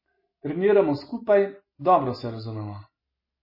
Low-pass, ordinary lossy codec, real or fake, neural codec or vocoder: 5.4 kHz; MP3, 24 kbps; real; none